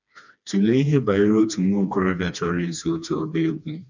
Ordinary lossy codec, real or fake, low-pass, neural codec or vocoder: none; fake; 7.2 kHz; codec, 16 kHz, 2 kbps, FreqCodec, smaller model